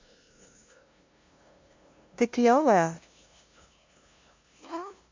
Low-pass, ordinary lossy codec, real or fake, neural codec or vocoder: 7.2 kHz; MP3, 48 kbps; fake; codec, 16 kHz, 0.5 kbps, FunCodec, trained on LibriTTS, 25 frames a second